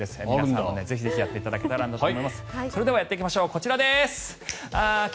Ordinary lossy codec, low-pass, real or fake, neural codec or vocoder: none; none; real; none